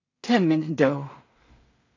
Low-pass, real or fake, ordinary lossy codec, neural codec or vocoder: 7.2 kHz; fake; AAC, 32 kbps; codec, 16 kHz in and 24 kHz out, 0.4 kbps, LongCat-Audio-Codec, two codebook decoder